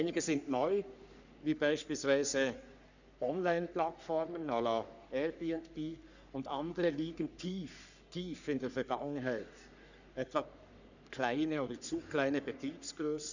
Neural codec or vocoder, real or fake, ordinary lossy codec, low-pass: codec, 44.1 kHz, 3.4 kbps, Pupu-Codec; fake; none; 7.2 kHz